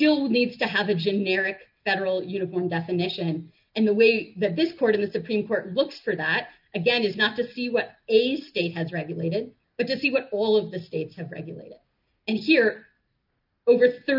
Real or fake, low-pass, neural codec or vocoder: real; 5.4 kHz; none